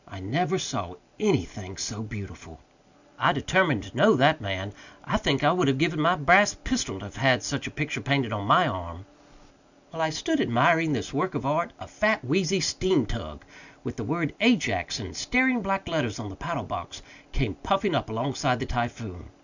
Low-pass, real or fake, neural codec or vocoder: 7.2 kHz; real; none